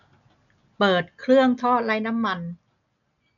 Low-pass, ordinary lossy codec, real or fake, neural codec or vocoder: 7.2 kHz; none; real; none